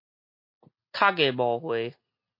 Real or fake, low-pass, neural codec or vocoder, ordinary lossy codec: real; 5.4 kHz; none; MP3, 32 kbps